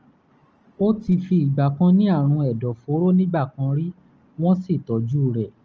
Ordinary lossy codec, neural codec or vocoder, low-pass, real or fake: Opus, 24 kbps; none; 7.2 kHz; real